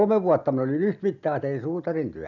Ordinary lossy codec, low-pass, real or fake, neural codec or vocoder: none; 7.2 kHz; real; none